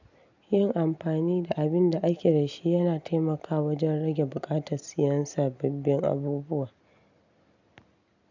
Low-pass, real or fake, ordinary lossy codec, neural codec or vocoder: 7.2 kHz; real; none; none